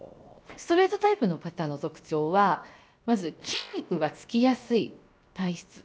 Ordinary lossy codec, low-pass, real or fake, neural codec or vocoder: none; none; fake; codec, 16 kHz, 0.7 kbps, FocalCodec